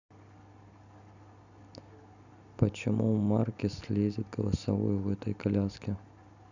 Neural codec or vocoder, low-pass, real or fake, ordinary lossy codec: none; 7.2 kHz; real; none